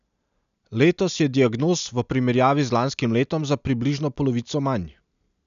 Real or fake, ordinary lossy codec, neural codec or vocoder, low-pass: real; none; none; 7.2 kHz